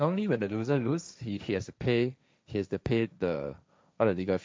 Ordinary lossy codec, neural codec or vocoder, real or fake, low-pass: none; codec, 16 kHz, 1.1 kbps, Voila-Tokenizer; fake; none